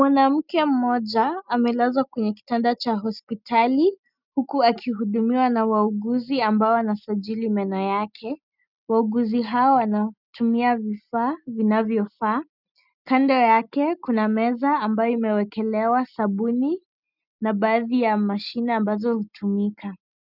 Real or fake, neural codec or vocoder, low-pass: real; none; 5.4 kHz